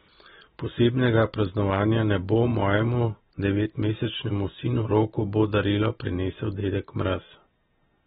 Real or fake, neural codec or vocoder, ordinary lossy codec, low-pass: real; none; AAC, 16 kbps; 19.8 kHz